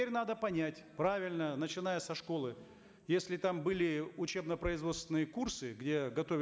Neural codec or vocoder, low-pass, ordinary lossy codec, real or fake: none; none; none; real